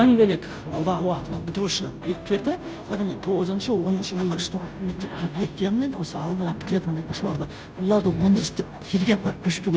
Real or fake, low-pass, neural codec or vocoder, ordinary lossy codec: fake; none; codec, 16 kHz, 0.5 kbps, FunCodec, trained on Chinese and English, 25 frames a second; none